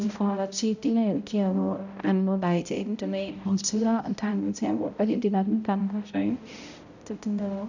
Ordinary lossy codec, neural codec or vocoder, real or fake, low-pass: none; codec, 16 kHz, 0.5 kbps, X-Codec, HuBERT features, trained on balanced general audio; fake; 7.2 kHz